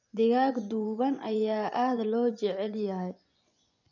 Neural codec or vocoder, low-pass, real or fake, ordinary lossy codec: vocoder, 24 kHz, 100 mel bands, Vocos; 7.2 kHz; fake; none